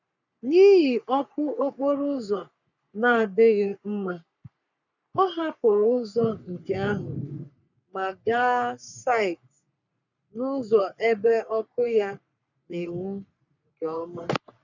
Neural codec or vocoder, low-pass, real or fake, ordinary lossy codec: codec, 44.1 kHz, 3.4 kbps, Pupu-Codec; 7.2 kHz; fake; none